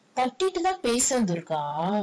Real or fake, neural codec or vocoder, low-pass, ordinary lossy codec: fake; vocoder, 22.05 kHz, 80 mel bands, Vocos; none; none